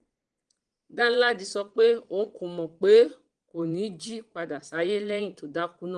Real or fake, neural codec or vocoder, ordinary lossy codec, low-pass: fake; vocoder, 22.05 kHz, 80 mel bands, Vocos; Opus, 24 kbps; 9.9 kHz